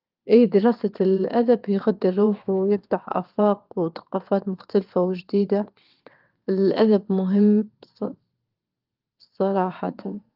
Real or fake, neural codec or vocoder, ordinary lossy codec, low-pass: fake; vocoder, 22.05 kHz, 80 mel bands, WaveNeXt; Opus, 32 kbps; 5.4 kHz